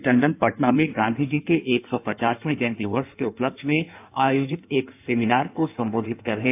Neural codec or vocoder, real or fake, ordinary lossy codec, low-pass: codec, 16 kHz in and 24 kHz out, 1.1 kbps, FireRedTTS-2 codec; fake; AAC, 32 kbps; 3.6 kHz